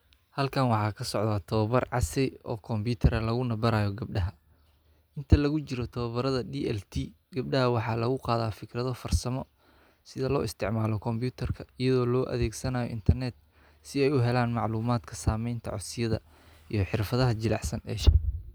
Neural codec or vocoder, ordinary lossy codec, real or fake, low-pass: none; none; real; none